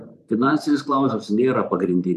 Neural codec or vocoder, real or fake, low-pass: vocoder, 44.1 kHz, 128 mel bands every 512 samples, BigVGAN v2; fake; 14.4 kHz